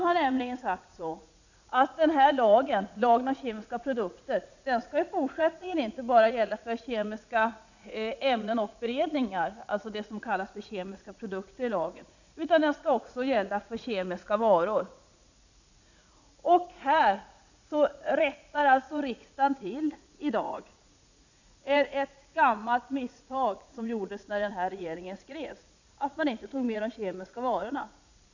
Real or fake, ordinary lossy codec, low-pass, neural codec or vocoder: fake; none; 7.2 kHz; vocoder, 44.1 kHz, 80 mel bands, Vocos